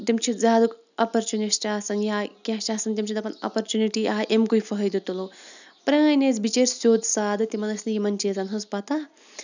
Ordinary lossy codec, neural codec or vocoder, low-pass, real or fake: none; none; 7.2 kHz; real